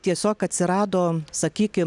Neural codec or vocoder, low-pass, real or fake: none; 10.8 kHz; real